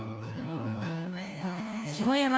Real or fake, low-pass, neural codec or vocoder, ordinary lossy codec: fake; none; codec, 16 kHz, 1 kbps, FunCodec, trained on LibriTTS, 50 frames a second; none